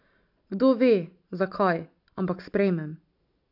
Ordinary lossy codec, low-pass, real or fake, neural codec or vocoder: none; 5.4 kHz; real; none